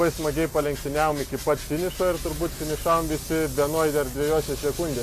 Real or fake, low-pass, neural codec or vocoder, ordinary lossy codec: real; 14.4 kHz; none; AAC, 96 kbps